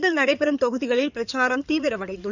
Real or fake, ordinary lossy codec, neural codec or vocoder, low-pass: fake; none; codec, 16 kHz in and 24 kHz out, 2.2 kbps, FireRedTTS-2 codec; 7.2 kHz